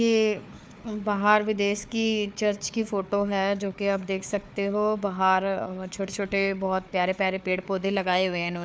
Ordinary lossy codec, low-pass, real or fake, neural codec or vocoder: none; none; fake; codec, 16 kHz, 4 kbps, FunCodec, trained on Chinese and English, 50 frames a second